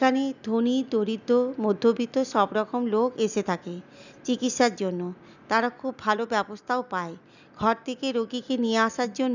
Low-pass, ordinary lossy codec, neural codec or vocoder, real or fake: 7.2 kHz; none; none; real